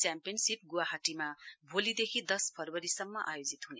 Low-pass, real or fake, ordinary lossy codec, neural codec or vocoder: none; real; none; none